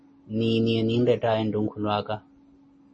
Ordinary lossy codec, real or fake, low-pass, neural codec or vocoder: MP3, 32 kbps; real; 9.9 kHz; none